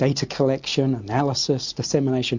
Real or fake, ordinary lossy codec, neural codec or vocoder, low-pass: real; MP3, 48 kbps; none; 7.2 kHz